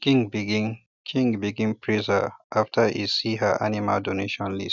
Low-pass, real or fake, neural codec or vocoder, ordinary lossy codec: 7.2 kHz; real; none; none